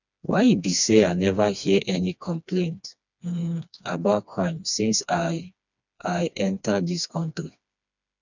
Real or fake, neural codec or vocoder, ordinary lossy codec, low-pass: fake; codec, 16 kHz, 2 kbps, FreqCodec, smaller model; none; 7.2 kHz